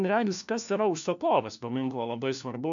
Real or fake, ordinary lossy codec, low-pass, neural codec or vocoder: fake; MP3, 96 kbps; 7.2 kHz; codec, 16 kHz, 1 kbps, FunCodec, trained on LibriTTS, 50 frames a second